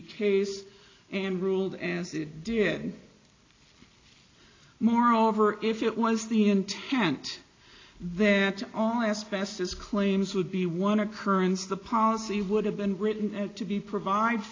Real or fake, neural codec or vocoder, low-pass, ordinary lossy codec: real; none; 7.2 kHz; AAC, 32 kbps